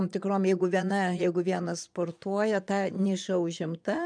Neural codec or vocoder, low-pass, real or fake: vocoder, 22.05 kHz, 80 mel bands, Vocos; 9.9 kHz; fake